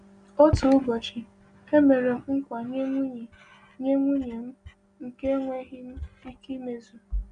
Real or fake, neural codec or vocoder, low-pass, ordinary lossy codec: real; none; 9.9 kHz; none